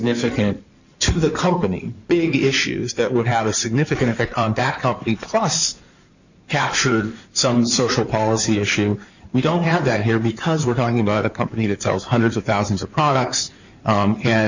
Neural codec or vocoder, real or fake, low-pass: codec, 16 kHz in and 24 kHz out, 2.2 kbps, FireRedTTS-2 codec; fake; 7.2 kHz